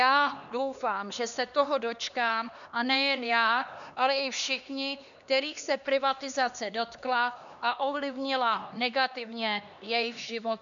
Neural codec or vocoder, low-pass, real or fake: codec, 16 kHz, 2 kbps, X-Codec, HuBERT features, trained on LibriSpeech; 7.2 kHz; fake